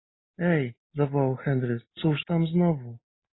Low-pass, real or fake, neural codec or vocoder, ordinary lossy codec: 7.2 kHz; real; none; AAC, 16 kbps